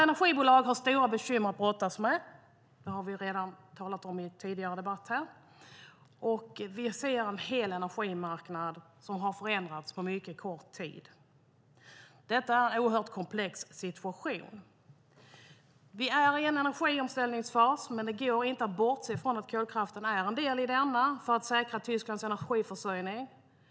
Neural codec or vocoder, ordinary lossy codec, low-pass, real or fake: none; none; none; real